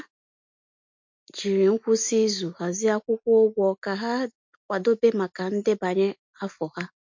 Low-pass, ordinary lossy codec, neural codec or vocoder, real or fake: 7.2 kHz; MP3, 48 kbps; none; real